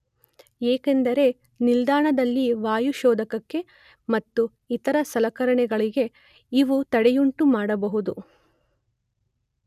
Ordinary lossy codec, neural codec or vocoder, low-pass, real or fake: none; none; 14.4 kHz; real